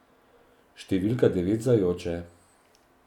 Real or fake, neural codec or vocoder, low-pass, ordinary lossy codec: real; none; 19.8 kHz; none